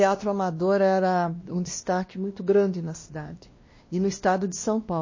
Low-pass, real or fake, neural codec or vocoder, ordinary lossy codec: 7.2 kHz; fake; codec, 16 kHz, 1 kbps, X-Codec, WavLM features, trained on Multilingual LibriSpeech; MP3, 32 kbps